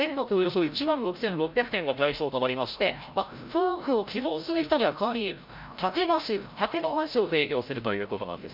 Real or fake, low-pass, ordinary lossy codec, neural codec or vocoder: fake; 5.4 kHz; MP3, 48 kbps; codec, 16 kHz, 0.5 kbps, FreqCodec, larger model